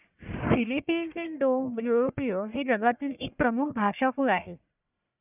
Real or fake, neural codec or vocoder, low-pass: fake; codec, 44.1 kHz, 1.7 kbps, Pupu-Codec; 3.6 kHz